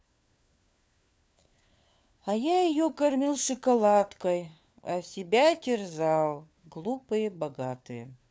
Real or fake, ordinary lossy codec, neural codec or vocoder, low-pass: fake; none; codec, 16 kHz, 4 kbps, FunCodec, trained on LibriTTS, 50 frames a second; none